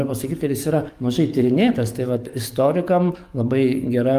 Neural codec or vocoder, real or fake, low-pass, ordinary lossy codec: codec, 44.1 kHz, 7.8 kbps, DAC; fake; 14.4 kHz; Opus, 32 kbps